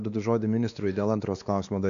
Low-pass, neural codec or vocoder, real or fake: 7.2 kHz; codec, 16 kHz, 2 kbps, X-Codec, WavLM features, trained on Multilingual LibriSpeech; fake